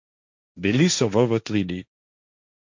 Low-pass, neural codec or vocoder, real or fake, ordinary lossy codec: 7.2 kHz; codec, 16 kHz, 1.1 kbps, Voila-Tokenizer; fake; MP3, 64 kbps